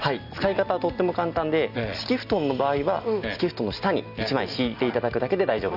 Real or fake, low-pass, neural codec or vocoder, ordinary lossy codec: real; 5.4 kHz; none; none